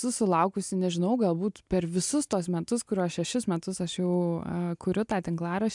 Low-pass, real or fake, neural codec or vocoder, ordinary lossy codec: 10.8 kHz; real; none; MP3, 96 kbps